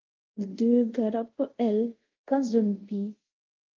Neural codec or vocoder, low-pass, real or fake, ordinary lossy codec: codec, 24 kHz, 0.5 kbps, DualCodec; 7.2 kHz; fake; Opus, 32 kbps